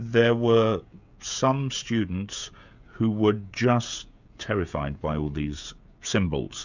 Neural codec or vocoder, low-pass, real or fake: codec, 16 kHz, 16 kbps, FreqCodec, smaller model; 7.2 kHz; fake